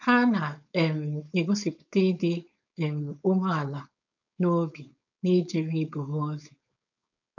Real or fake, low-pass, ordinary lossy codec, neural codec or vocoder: fake; 7.2 kHz; none; codec, 16 kHz, 4.8 kbps, FACodec